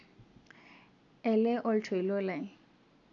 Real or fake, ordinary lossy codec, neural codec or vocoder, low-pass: real; none; none; 7.2 kHz